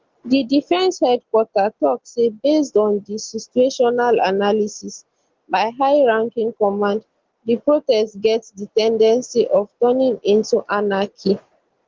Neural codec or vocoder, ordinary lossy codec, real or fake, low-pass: none; Opus, 16 kbps; real; 7.2 kHz